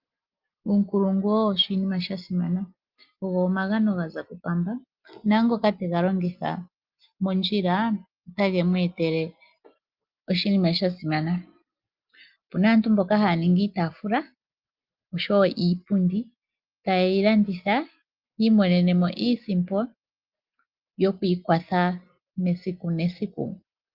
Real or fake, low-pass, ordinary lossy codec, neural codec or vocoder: real; 5.4 kHz; Opus, 32 kbps; none